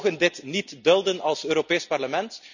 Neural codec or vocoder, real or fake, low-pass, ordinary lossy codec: none; real; 7.2 kHz; none